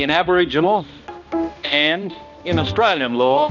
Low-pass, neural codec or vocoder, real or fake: 7.2 kHz; codec, 16 kHz, 1 kbps, X-Codec, HuBERT features, trained on balanced general audio; fake